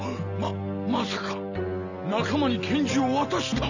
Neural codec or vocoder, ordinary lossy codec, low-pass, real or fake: none; none; 7.2 kHz; real